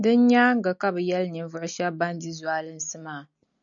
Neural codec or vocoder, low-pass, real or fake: none; 7.2 kHz; real